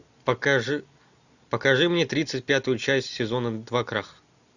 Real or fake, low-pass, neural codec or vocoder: real; 7.2 kHz; none